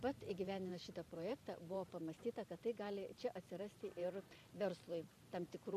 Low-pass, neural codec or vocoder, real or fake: 14.4 kHz; vocoder, 44.1 kHz, 128 mel bands every 512 samples, BigVGAN v2; fake